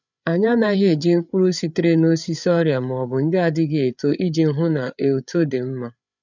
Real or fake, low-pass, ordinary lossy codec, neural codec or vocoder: fake; 7.2 kHz; none; codec, 16 kHz, 8 kbps, FreqCodec, larger model